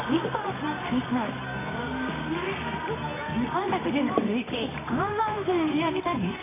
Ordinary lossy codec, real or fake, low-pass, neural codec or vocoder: AAC, 16 kbps; fake; 3.6 kHz; codec, 24 kHz, 0.9 kbps, WavTokenizer, medium music audio release